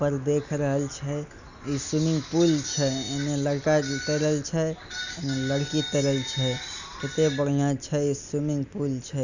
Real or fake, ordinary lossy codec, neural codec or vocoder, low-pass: real; none; none; 7.2 kHz